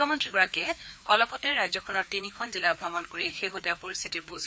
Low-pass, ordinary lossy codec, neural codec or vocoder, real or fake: none; none; codec, 16 kHz, 2 kbps, FreqCodec, larger model; fake